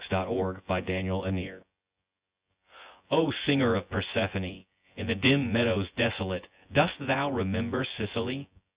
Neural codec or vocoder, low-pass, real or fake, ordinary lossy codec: vocoder, 24 kHz, 100 mel bands, Vocos; 3.6 kHz; fake; Opus, 64 kbps